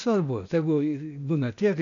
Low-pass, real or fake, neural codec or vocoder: 7.2 kHz; fake; codec, 16 kHz, 0.8 kbps, ZipCodec